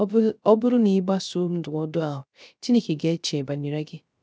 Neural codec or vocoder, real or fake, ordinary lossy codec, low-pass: codec, 16 kHz, 0.3 kbps, FocalCodec; fake; none; none